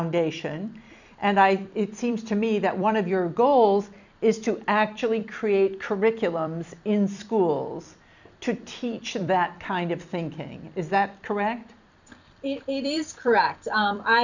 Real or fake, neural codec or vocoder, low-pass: fake; vocoder, 44.1 kHz, 128 mel bands every 256 samples, BigVGAN v2; 7.2 kHz